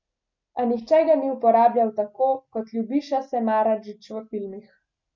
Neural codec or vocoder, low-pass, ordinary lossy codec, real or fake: none; 7.2 kHz; none; real